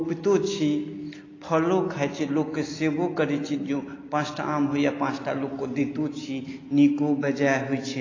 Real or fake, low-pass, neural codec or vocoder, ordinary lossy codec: real; 7.2 kHz; none; AAC, 32 kbps